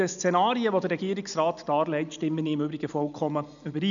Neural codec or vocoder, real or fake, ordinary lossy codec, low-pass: none; real; none; 7.2 kHz